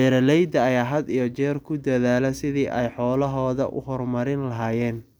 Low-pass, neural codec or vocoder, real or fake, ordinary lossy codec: none; none; real; none